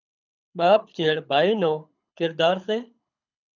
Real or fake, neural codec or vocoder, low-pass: fake; codec, 24 kHz, 6 kbps, HILCodec; 7.2 kHz